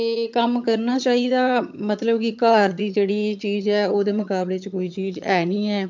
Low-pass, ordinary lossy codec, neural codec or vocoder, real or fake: 7.2 kHz; MP3, 64 kbps; vocoder, 22.05 kHz, 80 mel bands, HiFi-GAN; fake